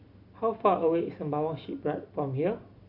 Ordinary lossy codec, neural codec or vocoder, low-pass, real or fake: Opus, 64 kbps; none; 5.4 kHz; real